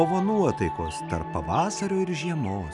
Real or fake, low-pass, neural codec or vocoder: real; 10.8 kHz; none